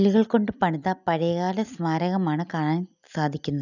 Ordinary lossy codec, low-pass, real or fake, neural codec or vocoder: none; 7.2 kHz; real; none